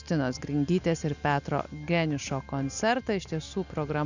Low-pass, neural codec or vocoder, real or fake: 7.2 kHz; none; real